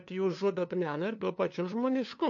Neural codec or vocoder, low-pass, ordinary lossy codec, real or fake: codec, 16 kHz, 2 kbps, FunCodec, trained on LibriTTS, 25 frames a second; 7.2 kHz; MP3, 48 kbps; fake